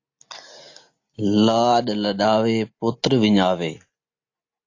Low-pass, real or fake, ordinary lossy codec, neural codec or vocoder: 7.2 kHz; real; AAC, 48 kbps; none